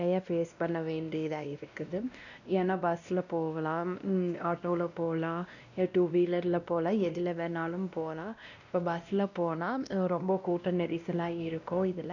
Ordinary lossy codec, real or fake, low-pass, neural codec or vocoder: none; fake; 7.2 kHz; codec, 16 kHz, 1 kbps, X-Codec, WavLM features, trained on Multilingual LibriSpeech